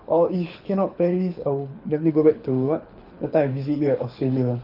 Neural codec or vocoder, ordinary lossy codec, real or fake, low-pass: codec, 24 kHz, 6 kbps, HILCodec; none; fake; 5.4 kHz